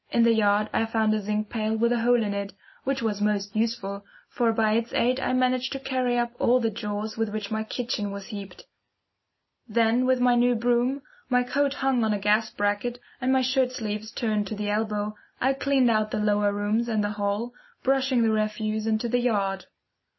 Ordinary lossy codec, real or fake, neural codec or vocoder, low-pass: MP3, 24 kbps; real; none; 7.2 kHz